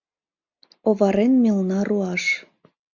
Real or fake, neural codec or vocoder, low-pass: real; none; 7.2 kHz